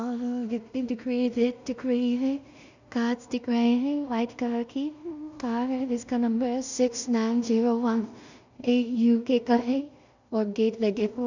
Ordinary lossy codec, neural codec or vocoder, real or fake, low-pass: none; codec, 16 kHz in and 24 kHz out, 0.4 kbps, LongCat-Audio-Codec, two codebook decoder; fake; 7.2 kHz